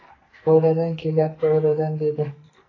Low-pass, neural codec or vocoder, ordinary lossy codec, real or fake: 7.2 kHz; codec, 44.1 kHz, 2.6 kbps, SNAC; AAC, 32 kbps; fake